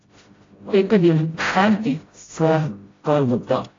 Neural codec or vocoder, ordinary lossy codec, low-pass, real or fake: codec, 16 kHz, 0.5 kbps, FreqCodec, smaller model; AAC, 32 kbps; 7.2 kHz; fake